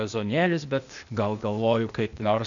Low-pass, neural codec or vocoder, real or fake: 7.2 kHz; codec, 16 kHz, 0.8 kbps, ZipCodec; fake